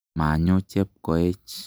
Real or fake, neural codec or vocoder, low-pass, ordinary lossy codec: real; none; none; none